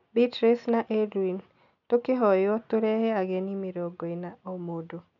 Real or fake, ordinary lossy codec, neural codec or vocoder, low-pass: real; none; none; 7.2 kHz